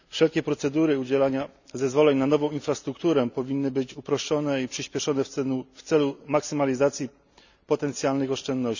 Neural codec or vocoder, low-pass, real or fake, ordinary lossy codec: none; 7.2 kHz; real; none